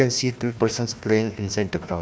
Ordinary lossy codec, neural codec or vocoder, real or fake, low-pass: none; codec, 16 kHz, 1 kbps, FunCodec, trained on Chinese and English, 50 frames a second; fake; none